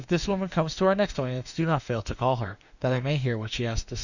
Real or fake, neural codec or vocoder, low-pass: fake; autoencoder, 48 kHz, 32 numbers a frame, DAC-VAE, trained on Japanese speech; 7.2 kHz